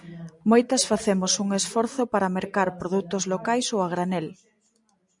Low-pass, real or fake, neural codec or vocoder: 10.8 kHz; real; none